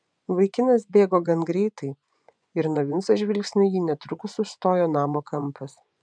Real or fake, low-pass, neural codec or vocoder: fake; 9.9 kHz; vocoder, 24 kHz, 100 mel bands, Vocos